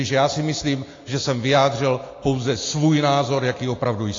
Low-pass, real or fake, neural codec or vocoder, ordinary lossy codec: 7.2 kHz; real; none; AAC, 32 kbps